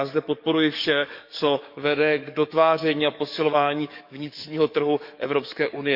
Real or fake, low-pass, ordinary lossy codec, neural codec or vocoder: fake; 5.4 kHz; none; codec, 44.1 kHz, 7.8 kbps, DAC